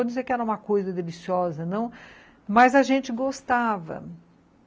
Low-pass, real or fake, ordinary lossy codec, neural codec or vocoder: none; real; none; none